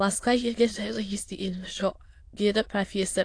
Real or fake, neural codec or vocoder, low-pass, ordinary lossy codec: fake; autoencoder, 22.05 kHz, a latent of 192 numbers a frame, VITS, trained on many speakers; 9.9 kHz; AAC, 48 kbps